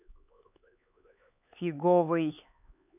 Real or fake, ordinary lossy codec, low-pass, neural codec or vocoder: fake; none; 3.6 kHz; codec, 16 kHz, 4 kbps, X-Codec, HuBERT features, trained on LibriSpeech